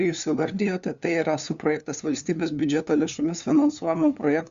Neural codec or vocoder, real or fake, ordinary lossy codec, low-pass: codec, 16 kHz, 2 kbps, FunCodec, trained on LibriTTS, 25 frames a second; fake; Opus, 64 kbps; 7.2 kHz